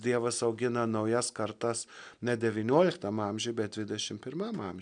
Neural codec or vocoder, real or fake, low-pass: none; real; 9.9 kHz